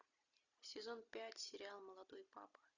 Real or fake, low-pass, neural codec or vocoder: real; 7.2 kHz; none